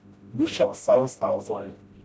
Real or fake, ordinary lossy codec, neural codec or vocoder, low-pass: fake; none; codec, 16 kHz, 0.5 kbps, FreqCodec, smaller model; none